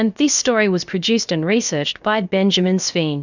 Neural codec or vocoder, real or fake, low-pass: codec, 16 kHz, about 1 kbps, DyCAST, with the encoder's durations; fake; 7.2 kHz